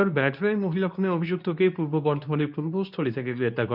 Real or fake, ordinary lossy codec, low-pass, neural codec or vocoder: fake; none; 5.4 kHz; codec, 24 kHz, 0.9 kbps, WavTokenizer, medium speech release version 2